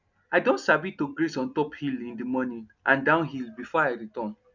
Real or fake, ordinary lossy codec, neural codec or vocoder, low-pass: real; none; none; 7.2 kHz